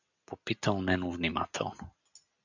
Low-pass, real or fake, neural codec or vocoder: 7.2 kHz; real; none